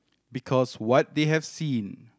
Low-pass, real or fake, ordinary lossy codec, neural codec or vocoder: none; real; none; none